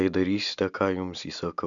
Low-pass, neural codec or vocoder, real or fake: 7.2 kHz; none; real